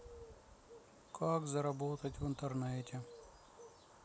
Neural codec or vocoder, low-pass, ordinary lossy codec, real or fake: none; none; none; real